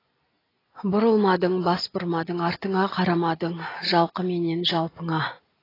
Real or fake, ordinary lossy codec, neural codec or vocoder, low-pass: real; AAC, 24 kbps; none; 5.4 kHz